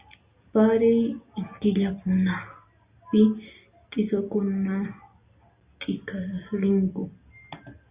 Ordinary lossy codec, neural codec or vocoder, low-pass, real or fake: Opus, 64 kbps; none; 3.6 kHz; real